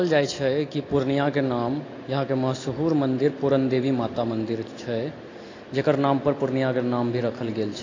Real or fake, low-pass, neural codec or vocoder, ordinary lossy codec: real; 7.2 kHz; none; AAC, 32 kbps